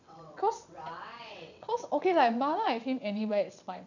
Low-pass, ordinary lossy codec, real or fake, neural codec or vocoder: 7.2 kHz; none; fake; vocoder, 22.05 kHz, 80 mel bands, WaveNeXt